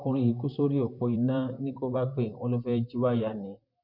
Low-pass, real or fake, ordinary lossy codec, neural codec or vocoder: 5.4 kHz; fake; MP3, 48 kbps; vocoder, 44.1 kHz, 128 mel bands, Pupu-Vocoder